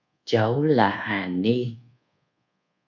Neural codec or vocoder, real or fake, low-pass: codec, 24 kHz, 1.2 kbps, DualCodec; fake; 7.2 kHz